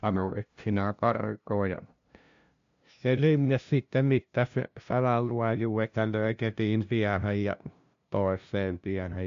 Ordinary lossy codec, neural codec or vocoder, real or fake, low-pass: MP3, 64 kbps; codec, 16 kHz, 0.5 kbps, FunCodec, trained on LibriTTS, 25 frames a second; fake; 7.2 kHz